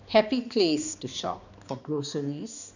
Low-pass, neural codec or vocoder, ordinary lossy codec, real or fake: 7.2 kHz; codec, 16 kHz, 2 kbps, X-Codec, HuBERT features, trained on balanced general audio; none; fake